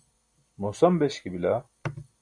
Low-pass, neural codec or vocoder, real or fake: 9.9 kHz; none; real